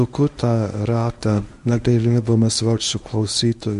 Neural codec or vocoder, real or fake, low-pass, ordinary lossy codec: codec, 24 kHz, 0.9 kbps, WavTokenizer, medium speech release version 1; fake; 10.8 kHz; MP3, 64 kbps